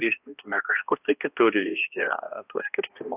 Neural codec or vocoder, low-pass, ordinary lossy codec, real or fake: codec, 16 kHz, 1 kbps, X-Codec, HuBERT features, trained on balanced general audio; 3.6 kHz; AAC, 24 kbps; fake